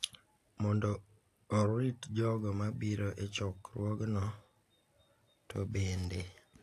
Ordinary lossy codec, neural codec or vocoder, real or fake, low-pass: AAC, 64 kbps; none; real; 14.4 kHz